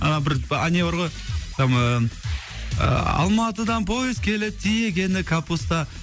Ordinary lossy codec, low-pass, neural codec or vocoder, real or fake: none; none; none; real